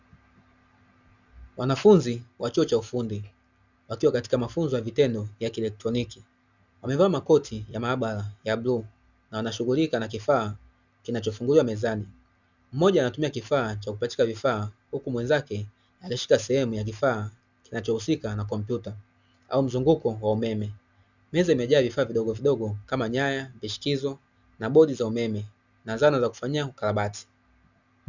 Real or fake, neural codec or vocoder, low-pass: real; none; 7.2 kHz